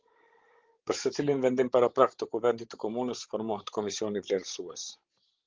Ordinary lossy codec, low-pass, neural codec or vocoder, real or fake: Opus, 16 kbps; 7.2 kHz; codec, 16 kHz, 16 kbps, FreqCodec, larger model; fake